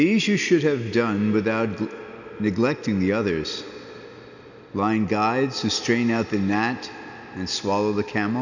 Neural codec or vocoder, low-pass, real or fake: none; 7.2 kHz; real